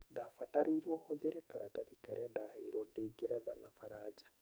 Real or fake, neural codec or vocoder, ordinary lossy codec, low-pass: fake; codec, 44.1 kHz, 2.6 kbps, SNAC; none; none